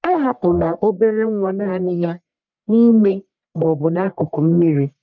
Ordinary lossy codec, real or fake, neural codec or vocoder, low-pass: none; fake; codec, 44.1 kHz, 1.7 kbps, Pupu-Codec; 7.2 kHz